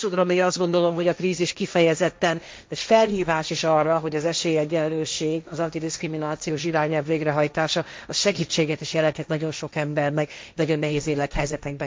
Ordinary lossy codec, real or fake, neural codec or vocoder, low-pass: none; fake; codec, 16 kHz, 1.1 kbps, Voila-Tokenizer; none